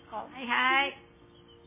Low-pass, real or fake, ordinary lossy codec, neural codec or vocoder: 3.6 kHz; real; MP3, 16 kbps; none